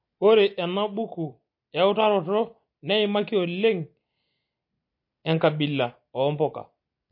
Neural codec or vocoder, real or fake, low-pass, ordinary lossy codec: none; real; 5.4 kHz; MP3, 32 kbps